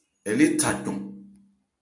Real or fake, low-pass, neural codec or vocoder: real; 10.8 kHz; none